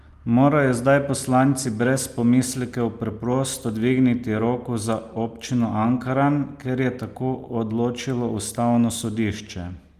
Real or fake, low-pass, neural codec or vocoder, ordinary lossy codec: real; 14.4 kHz; none; Opus, 32 kbps